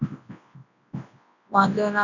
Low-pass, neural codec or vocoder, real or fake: 7.2 kHz; codec, 24 kHz, 0.9 kbps, WavTokenizer, large speech release; fake